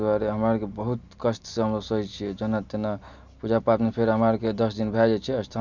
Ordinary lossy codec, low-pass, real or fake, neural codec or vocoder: none; 7.2 kHz; real; none